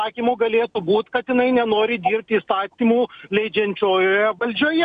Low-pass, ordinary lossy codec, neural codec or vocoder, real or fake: 9.9 kHz; AAC, 96 kbps; none; real